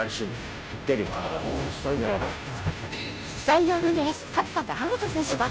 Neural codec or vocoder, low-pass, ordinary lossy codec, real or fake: codec, 16 kHz, 0.5 kbps, FunCodec, trained on Chinese and English, 25 frames a second; none; none; fake